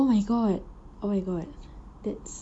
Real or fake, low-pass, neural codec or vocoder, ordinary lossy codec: real; 9.9 kHz; none; none